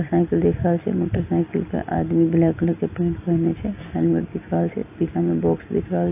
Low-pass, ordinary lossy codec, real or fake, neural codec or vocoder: 3.6 kHz; AAC, 32 kbps; real; none